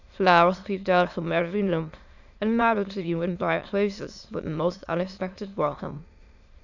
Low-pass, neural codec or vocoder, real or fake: 7.2 kHz; autoencoder, 22.05 kHz, a latent of 192 numbers a frame, VITS, trained on many speakers; fake